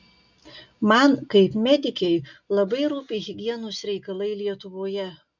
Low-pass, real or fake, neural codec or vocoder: 7.2 kHz; real; none